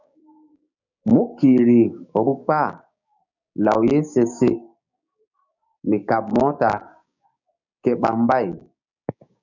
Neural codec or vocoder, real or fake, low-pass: codec, 16 kHz, 6 kbps, DAC; fake; 7.2 kHz